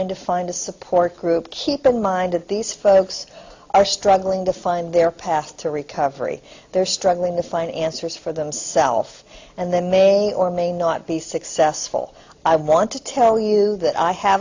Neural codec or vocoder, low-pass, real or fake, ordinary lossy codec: none; 7.2 kHz; real; AAC, 48 kbps